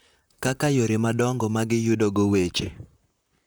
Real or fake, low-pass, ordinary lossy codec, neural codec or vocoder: fake; none; none; vocoder, 44.1 kHz, 128 mel bands, Pupu-Vocoder